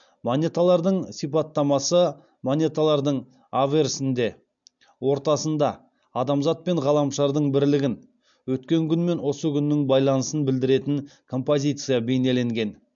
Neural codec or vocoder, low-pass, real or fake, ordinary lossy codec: none; 7.2 kHz; real; MP3, 64 kbps